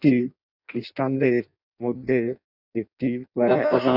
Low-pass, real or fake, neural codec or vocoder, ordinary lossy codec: 5.4 kHz; fake; codec, 16 kHz in and 24 kHz out, 0.6 kbps, FireRedTTS-2 codec; none